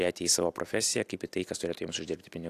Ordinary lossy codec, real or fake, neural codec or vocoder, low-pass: AAC, 64 kbps; real; none; 14.4 kHz